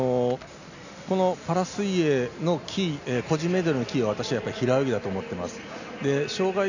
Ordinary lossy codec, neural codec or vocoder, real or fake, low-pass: none; none; real; 7.2 kHz